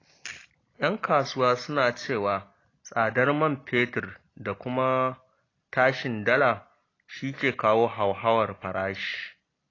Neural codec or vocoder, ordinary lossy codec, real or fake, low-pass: none; AAC, 32 kbps; real; 7.2 kHz